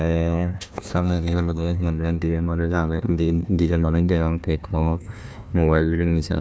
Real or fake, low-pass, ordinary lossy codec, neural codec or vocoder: fake; none; none; codec, 16 kHz, 1 kbps, FunCodec, trained on Chinese and English, 50 frames a second